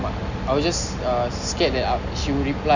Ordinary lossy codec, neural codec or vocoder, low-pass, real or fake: none; none; 7.2 kHz; real